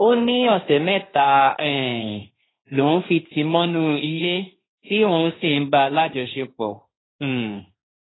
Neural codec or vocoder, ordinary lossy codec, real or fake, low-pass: codec, 16 kHz, 1.1 kbps, Voila-Tokenizer; AAC, 16 kbps; fake; 7.2 kHz